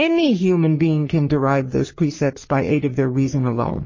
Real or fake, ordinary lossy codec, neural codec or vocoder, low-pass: fake; MP3, 32 kbps; codec, 44.1 kHz, 3.4 kbps, Pupu-Codec; 7.2 kHz